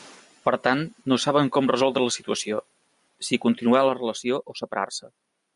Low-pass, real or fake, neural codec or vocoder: 10.8 kHz; real; none